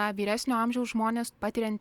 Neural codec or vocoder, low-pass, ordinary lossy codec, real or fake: none; 19.8 kHz; Opus, 64 kbps; real